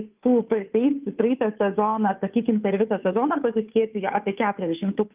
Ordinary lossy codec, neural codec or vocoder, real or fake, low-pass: Opus, 24 kbps; codec, 16 kHz, 2 kbps, FunCodec, trained on Chinese and English, 25 frames a second; fake; 3.6 kHz